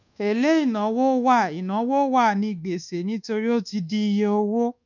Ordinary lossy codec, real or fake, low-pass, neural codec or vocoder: none; fake; 7.2 kHz; codec, 24 kHz, 1.2 kbps, DualCodec